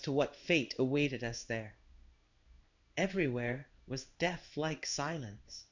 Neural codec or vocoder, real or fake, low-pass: codec, 16 kHz in and 24 kHz out, 1 kbps, XY-Tokenizer; fake; 7.2 kHz